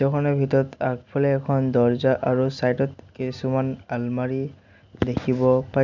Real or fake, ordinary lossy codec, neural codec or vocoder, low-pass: real; none; none; 7.2 kHz